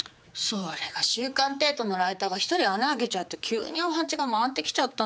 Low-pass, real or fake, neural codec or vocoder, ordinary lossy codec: none; fake; codec, 16 kHz, 4 kbps, X-Codec, HuBERT features, trained on general audio; none